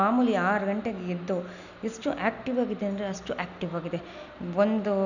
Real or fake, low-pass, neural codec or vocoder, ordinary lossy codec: real; 7.2 kHz; none; none